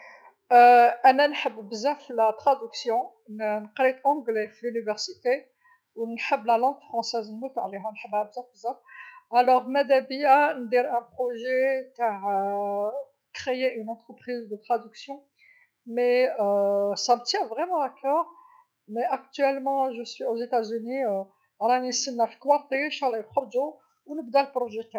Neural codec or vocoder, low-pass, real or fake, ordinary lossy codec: autoencoder, 48 kHz, 128 numbers a frame, DAC-VAE, trained on Japanese speech; none; fake; none